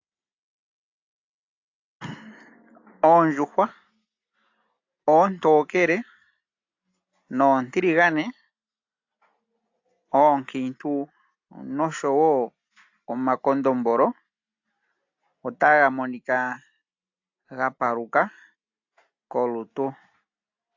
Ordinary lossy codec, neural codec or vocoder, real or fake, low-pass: AAC, 48 kbps; none; real; 7.2 kHz